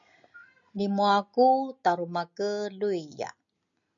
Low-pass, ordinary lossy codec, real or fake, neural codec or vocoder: 7.2 kHz; MP3, 96 kbps; real; none